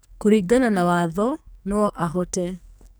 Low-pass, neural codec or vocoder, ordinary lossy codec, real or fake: none; codec, 44.1 kHz, 2.6 kbps, SNAC; none; fake